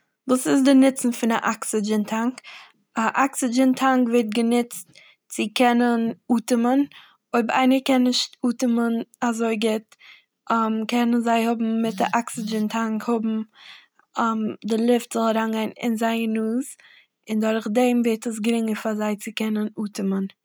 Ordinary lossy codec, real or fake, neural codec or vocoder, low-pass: none; real; none; none